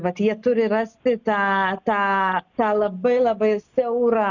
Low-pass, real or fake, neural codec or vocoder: 7.2 kHz; real; none